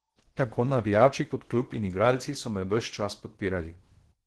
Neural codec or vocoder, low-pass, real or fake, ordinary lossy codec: codec, 16 kHz in and 24 kHz out, 0.8 kbps, FocalCodec, streaming, 65536 codes; 10.8 kHz; fake; Opus, 16 kbps